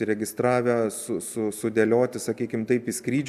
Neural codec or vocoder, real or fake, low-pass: none; real; 14.4 kHz